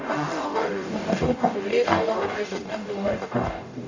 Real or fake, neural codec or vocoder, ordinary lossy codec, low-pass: fake; codec, 44.1 kHz, 0.9 kbps, DAC; AAC, 48 kbps; 7.2 kHz